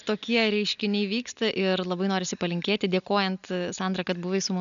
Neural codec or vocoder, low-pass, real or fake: none; 7.2 kHz; real